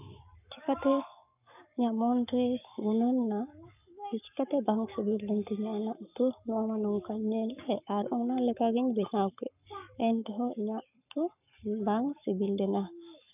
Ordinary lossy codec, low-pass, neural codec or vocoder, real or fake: none; 3.6 kHz; codec, 44.1 kHz, 7.8 kbps, Pupu-Codec; fake